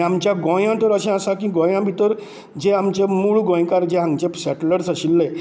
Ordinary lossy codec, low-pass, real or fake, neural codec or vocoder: none; none; real; none